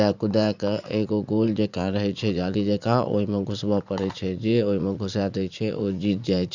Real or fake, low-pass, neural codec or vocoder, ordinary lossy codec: fake; 7.2 kHz; vocoder, 44.1 kHz, 80 mel bands, Vocos; Opus, 64 kbps